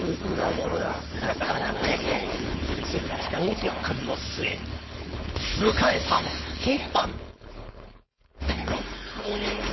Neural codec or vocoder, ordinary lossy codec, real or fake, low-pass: codec, 16 kHz, 4.8 kbps, FACodec; MP3, 24 kbps; fake; 7.2 kHz